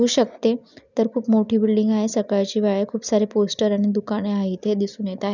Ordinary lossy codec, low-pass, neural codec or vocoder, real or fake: none; 7.2 kHz; none; real